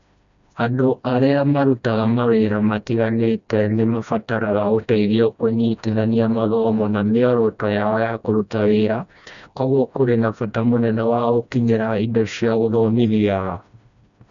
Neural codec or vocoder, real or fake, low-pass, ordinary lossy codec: codec, 16 kHz, 1 kbps, FreqCodec, smaller model; fake; 7.2 kHz; none